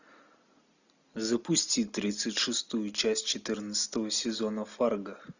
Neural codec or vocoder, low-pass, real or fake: none; 7.2 kHz; real